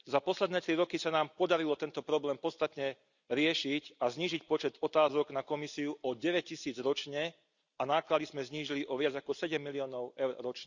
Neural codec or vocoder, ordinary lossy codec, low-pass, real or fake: none; none; 7.2 kHz; real